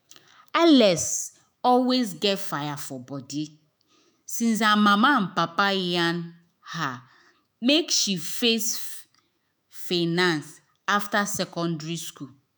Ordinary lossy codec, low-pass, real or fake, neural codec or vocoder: none; none; fake; autoencoder, 48 kHz, 128 numbers a frame, DAC-VAE, trained on Japanese speech